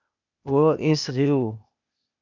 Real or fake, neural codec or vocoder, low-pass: fake; codec, 16 kHz, 0.8 kbps, ZipCodec; 7.2 kHz